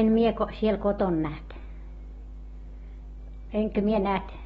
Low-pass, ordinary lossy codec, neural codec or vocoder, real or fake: 19.8 kHz; AAC, 24 kbps; none; real